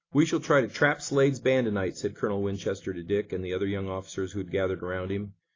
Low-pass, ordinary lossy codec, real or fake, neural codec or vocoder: 7.2 kHz; AAC, 32 kbps; real; none